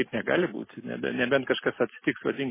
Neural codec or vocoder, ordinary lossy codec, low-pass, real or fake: none; MP3, 16 kbps; 3.6 kHz; real